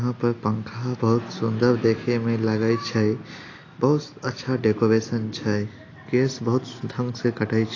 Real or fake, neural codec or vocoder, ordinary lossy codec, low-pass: real; none; AAC, 32 kbps; 7.2 kHz